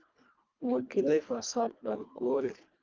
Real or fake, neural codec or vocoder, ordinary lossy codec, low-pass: fake; codec, 24 kHz, 1.5 kbps, HILCodec; Opus, 24 kbps; 7.2 kHz